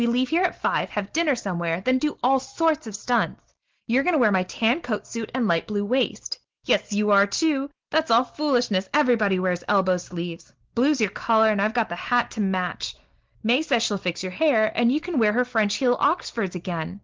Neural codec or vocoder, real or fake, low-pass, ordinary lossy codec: none; real; 7.2 kHz; Opus, 16 kbps